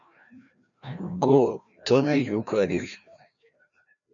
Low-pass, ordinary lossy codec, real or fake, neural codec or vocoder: 7.2 kHz; MP3, 96 kbps; fake; codec, 16 kHz, 1 kbps, FreqCodec, larger model